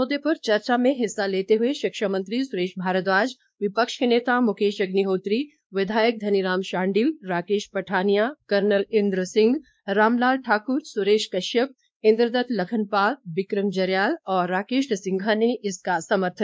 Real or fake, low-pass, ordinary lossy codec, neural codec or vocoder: fake; none; none; codec, 16 kHz, 2 kbps, X-Codec, WavLM features, trained on Multilingual LibriSpeech